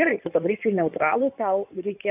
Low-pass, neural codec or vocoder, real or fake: 3.6 kHz; codec, 16 kHz in and 24 kHz out, 2.2 kbps, FireRedTTS-2 codec; fake